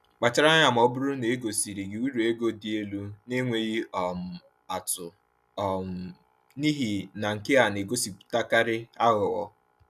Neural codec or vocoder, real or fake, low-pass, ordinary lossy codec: none; real; 14.4 kHz; none